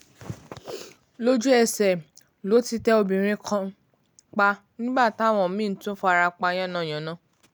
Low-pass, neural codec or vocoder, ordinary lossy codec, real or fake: none; none; none; real